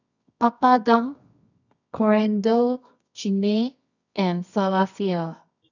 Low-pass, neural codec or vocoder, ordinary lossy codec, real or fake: 7.2 kHz; codec, 24 kHz, 0.9 kbps, WavTokenizer, medium music audio release; none; fake